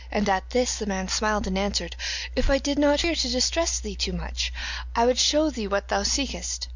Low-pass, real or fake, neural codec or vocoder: 7.2 kHz; real; none